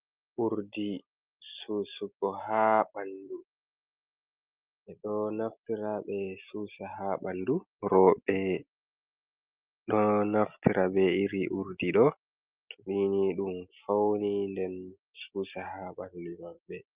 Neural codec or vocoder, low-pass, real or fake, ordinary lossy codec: none; 3.6 kHz; real; Opus, 24 kbps